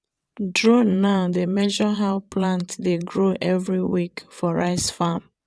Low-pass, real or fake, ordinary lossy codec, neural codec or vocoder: none; fake; none; vocoder, 22.05 kHz, 80 mel bands, WaveNeXt